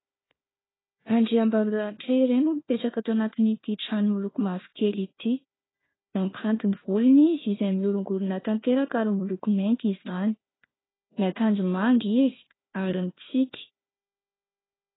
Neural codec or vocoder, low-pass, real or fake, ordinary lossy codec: codec, 16 kHz, 1 kbps, FunCodec, trained on Chinese and English, 50 frames a second; 7.2 kHz; fake; AAC, 16 kbps